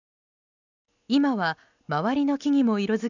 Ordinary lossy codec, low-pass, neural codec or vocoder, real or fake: none; 7.2 kHz; none; real